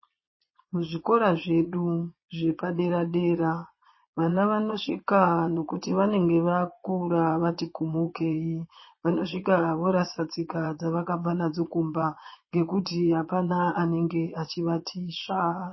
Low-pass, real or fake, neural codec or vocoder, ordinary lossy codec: 7.2 kHz; fake; vocoder, 22.05 kHz, 80 mel bands, Vocos; MP3, 24 kbps